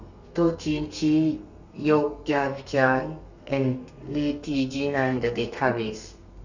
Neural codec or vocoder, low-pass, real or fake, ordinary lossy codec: codec, 32 kHz, 1.9 kbps, SNAC; 7.2 kHz; fake; none